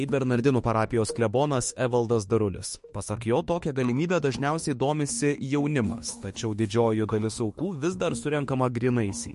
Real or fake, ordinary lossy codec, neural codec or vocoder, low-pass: fake; MP3, 48 kbps; autoencoder, 48 kHz, 32 numbers a frame, DAC-VAE, trained on Japanese speech; 14.4 kHz